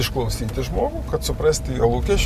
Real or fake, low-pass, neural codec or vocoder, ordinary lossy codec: real; 14.4 kHz; none; MP3, 96 kbps